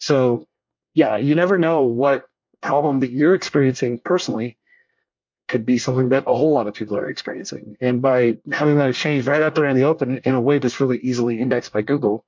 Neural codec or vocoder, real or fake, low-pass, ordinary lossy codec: codec, 24 kHz, 1 kbps, SNAC; fake; 7.2 kHz; MP3, 48 kbps